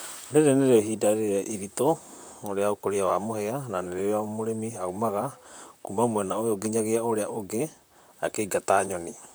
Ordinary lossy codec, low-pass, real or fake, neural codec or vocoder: none; none; fake; vocoder, 44.1 kHz, 128 mel bands, Pupu-Vocoder